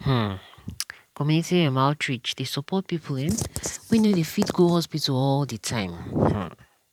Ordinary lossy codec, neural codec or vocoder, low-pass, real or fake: none; vocoder, 44.1 kHz, 128 mel bands every 512 samples, BigVGAN v2; 19.8 kHz; fake